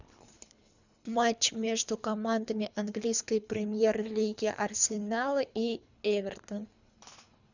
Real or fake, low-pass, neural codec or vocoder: fake; 7.2 kHz; codec, 24 kHz, 3 kbps, HILCodec